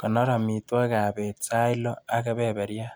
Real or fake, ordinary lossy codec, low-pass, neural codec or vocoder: real; none; none; none